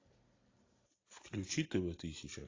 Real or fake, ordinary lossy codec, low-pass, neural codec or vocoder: real; AAC, 32 kbps; 7.2 kHz; none